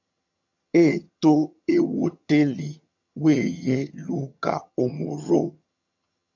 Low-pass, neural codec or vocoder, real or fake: 7.2 kHz; vocoder, 22.05 kHz, 80 mel bands, HiFi-GAN; fake